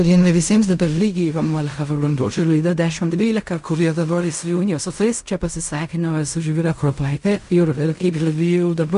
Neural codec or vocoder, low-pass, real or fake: codec, 16 kHz in and 24 kHz out, 0.4 kbps, LongCat-Audio-Codec, fine tuned four codebook decoder; 10.8 kHz; fake